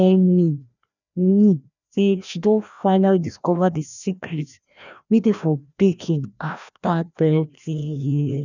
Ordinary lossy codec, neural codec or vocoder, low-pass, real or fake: none; codec, 16 kHz, 1 kbps, FreqCodec, larger model; 7.2 kHz; fake